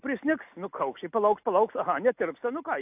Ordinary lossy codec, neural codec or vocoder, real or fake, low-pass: AAC, 32 kbps; none; real; 3.6 kHz